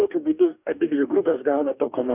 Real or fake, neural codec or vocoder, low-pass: fake; codec, 44.1 kHz, 2.6 kbps, DAC; 3.6 kHz